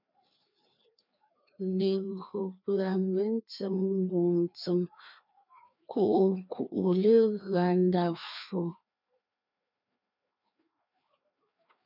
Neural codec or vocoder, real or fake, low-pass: codec, 16 kHz, 2 kbps, FreqCodec, larger model; fake; 5.4 kHz